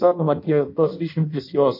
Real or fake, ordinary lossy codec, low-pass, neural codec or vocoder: fake; MP3, 32 kbps; 5.4 kHz; codec, 16 kHz in and 24 kHz out, 0.6 kbps, FireRedTTS-2 codec